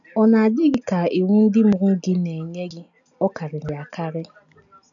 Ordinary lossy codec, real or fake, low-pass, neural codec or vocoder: none; real; 7.2 kHz; none